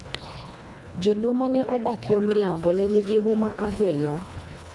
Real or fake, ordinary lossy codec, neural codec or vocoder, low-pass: fake; none; codec, 24 kHz, 1.5 kbps, HILCodec; none